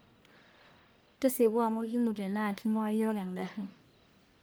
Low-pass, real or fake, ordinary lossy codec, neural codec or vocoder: none; fake; none; codec, 44.1 kHz, 1.7 kbps, Pupu-Codec